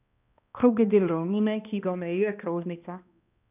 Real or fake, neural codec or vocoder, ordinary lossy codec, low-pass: fake; codec, 16 kHz, 1 kbps, X-Codec, HuBERT features, trained on balanced general audio; none; 3.6 kHz